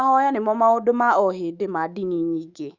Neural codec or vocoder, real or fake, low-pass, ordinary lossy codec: autoencoder, 48 kHz, 128 numbers a frame, DAC-VAE, trained on Japanese speech; fake; 7.2 kHz; Opus, 64 kbps